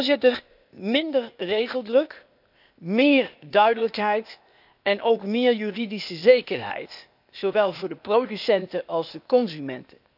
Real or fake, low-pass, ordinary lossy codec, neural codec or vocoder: fake; 5.4 kHz; none; codec, 16 kHz, 0.8 kbps, ZipCodec